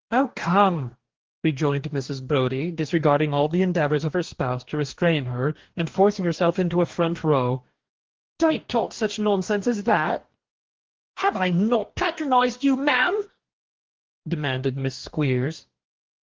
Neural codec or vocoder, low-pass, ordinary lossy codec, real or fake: codec, 44.1 kHz, 2.6 kbps, DAC; 7.2 kHz; Opus, 16 kbps; fake